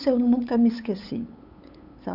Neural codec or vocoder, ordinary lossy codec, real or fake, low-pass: codec, 16 kHz, 8 kbps, FunCodec, trained on LibriTTS, 25 frames a second; none; fake; 5.4 kHz